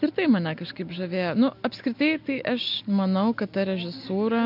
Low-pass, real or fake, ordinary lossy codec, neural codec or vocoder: 5.4 kHz; real; MP3, 48 kbps; none